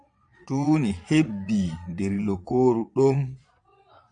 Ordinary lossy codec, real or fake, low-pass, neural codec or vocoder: AAC, 64 kbps; fake; 9.9 kHz; vocoder, 22.05 kHz, 80 mel bands, Vocos